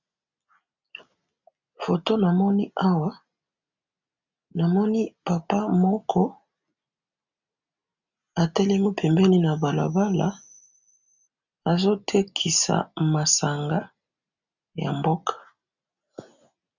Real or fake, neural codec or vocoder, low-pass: real; none; 7.2 kHz